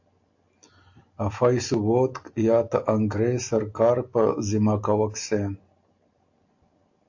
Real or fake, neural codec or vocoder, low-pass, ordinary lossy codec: real; none; 7.2 kHz; AAC, 48 kbps